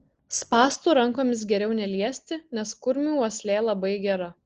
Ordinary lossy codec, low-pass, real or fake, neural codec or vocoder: Opus, 16 kbps; 7.2 kHz; real; none